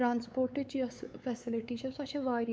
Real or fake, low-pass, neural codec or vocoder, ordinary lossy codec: fake; none; codec, 16 kHz, 4 kbps, X-Codec, WavLM features, trained on Multilingual LibriSpeech; none